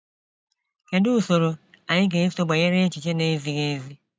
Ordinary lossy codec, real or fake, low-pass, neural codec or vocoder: none; real; none; none